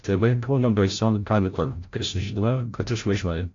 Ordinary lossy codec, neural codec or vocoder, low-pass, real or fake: AAC, 48 kbps; codec, 16 kHz, 0.5 kbps, FreqCodec, larger model; 7.2 kHz; fake